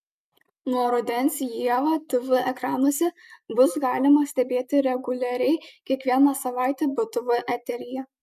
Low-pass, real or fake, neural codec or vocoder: 14.4 kHz; real; none